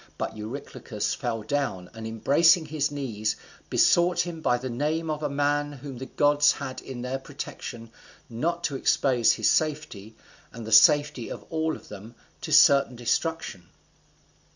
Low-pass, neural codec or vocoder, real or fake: 7.2 kHz; none; real